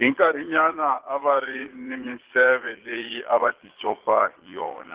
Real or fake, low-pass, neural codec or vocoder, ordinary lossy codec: fake; 3.6 kHz; vocoder, 22.05 kHz, 80 mel bands, Vocos; Opus, 16 kbps